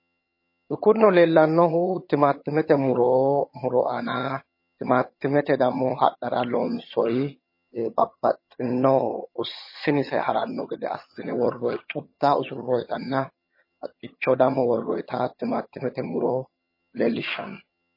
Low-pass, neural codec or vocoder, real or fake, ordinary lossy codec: 5.4 kHz; vocoder, 22.05 kHz, 80 mel bands, HiFi-GAN; fake; MP3, 24 kbps